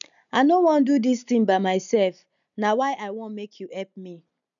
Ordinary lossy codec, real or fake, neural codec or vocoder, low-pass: none; real; none; 7.2 kHz